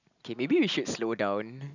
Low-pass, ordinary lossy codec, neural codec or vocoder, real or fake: 7.2 kHz; none; none; real